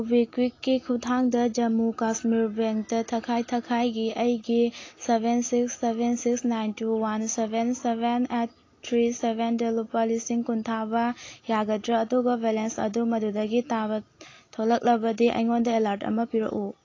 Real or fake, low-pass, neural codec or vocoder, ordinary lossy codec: real; 7.2 kHz; none; AAC, 32 kbps